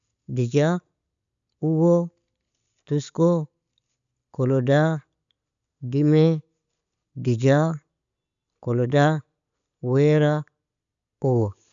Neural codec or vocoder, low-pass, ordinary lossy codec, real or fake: none; 7.2 kHz; none; real